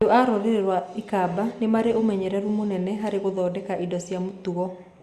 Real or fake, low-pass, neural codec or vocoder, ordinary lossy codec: real; 14.4 kHz; none; Opus, 64 kbps